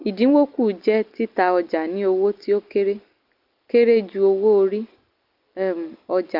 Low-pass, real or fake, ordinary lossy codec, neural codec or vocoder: 5.4 kHz; real; Opus, 32 kbps; none